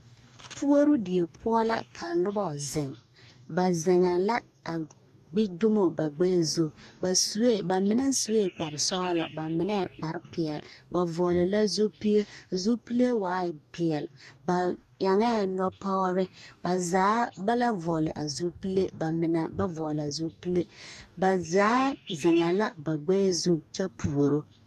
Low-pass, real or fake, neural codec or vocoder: 14.4 kHz; fake; codec, 44.1 kHz, 2.6 kbps, DAC